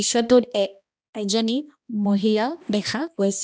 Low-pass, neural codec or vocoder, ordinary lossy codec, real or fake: none; codec, 16 kHz, 1 kbps, X-Codec, HuBERT features, trained on balanced general audio; none; fake